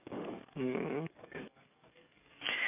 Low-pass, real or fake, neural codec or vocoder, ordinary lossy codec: 3.6 kHz; fake; codec, 44.1 kHz, 7.8 kbps, DAC; none